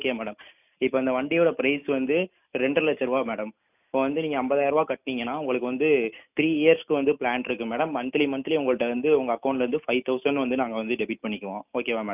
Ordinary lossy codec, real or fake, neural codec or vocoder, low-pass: none; real; none; 3.6 kHz